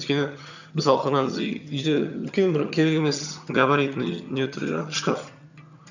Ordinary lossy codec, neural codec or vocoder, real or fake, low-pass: none; vocoder, 22.05 kHz, 80 mel bands, HiFi-GAN; fake; 7.2 kHz